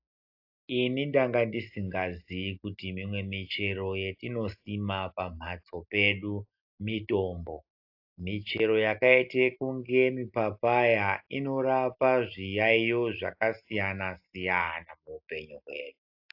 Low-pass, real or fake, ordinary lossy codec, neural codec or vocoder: 5.4 kHz; real; AAC, 48 kbps; none